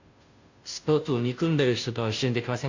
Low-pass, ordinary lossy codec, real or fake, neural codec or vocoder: 7.2 kHz; AAC, 48 kbps; fake; codec, 16 kHz, 0.5 kbps, FunCodec, trained on Chinese and English, 25 frames a second